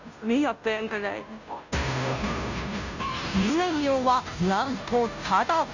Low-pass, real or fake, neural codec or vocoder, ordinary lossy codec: 7.2 kHz; fake; codec, 16 kHz, 0.5 kbps, FunCodec, trained on Chinese and English, 25 frames a second; none